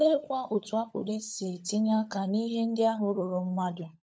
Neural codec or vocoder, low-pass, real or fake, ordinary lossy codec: codec, 16 kHz, 16 kbps, FunCodec, trained on LibriTTS, 50 frames a second; none; fake; none